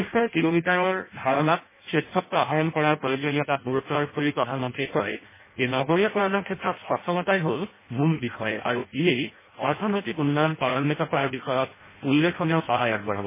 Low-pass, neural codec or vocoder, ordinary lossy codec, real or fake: 3.6 kHz; codec, 16 kHz in and 24 kHz out, 0.6 kbps, FireRedTTS-2 codec; MP3, 16 kbps; fake